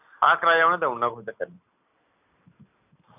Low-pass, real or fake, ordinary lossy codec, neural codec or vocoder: 3.6 kHz; real; none; none